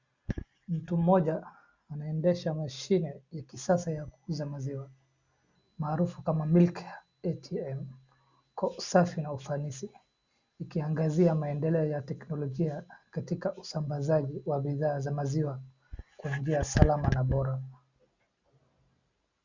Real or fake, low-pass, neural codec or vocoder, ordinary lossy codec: real; 7.2 kHz; none; Opus, 64 kbps